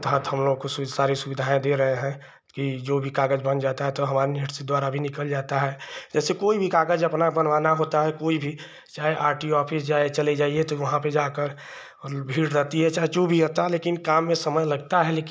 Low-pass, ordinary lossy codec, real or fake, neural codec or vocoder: none; none; real; none